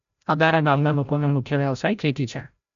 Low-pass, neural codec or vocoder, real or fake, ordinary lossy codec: 7.2 kHz; codec, 16 kHz, 0.5 kbps, FreqCodec, larger model; fake; none